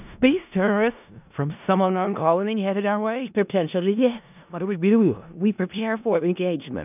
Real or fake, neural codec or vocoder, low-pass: fake; codec, 16 kHz in and 24 kHz out, 0.4 kbps, LongCat-Audio-Codec, four codebook decoder; 3.6 kHz